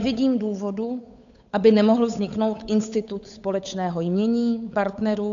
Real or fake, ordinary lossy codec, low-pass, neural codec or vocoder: fake; AAC, 64 kbps; 7.2 kHz; codec, 16 kHz, 8 kbps, FunCodec, trained on Chinese and English, 25 frames a second